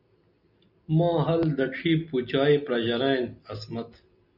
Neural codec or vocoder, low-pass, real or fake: none; 5.4 kHz; real